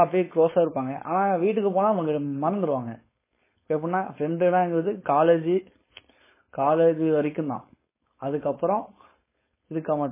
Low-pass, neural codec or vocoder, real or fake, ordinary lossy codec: 3.6 kHz; codec, 16 kHz, 4.8 kbps, FACodec; fake; MP3, 16 kbps